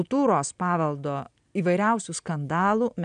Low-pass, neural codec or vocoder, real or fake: 9.9 kHz; none; real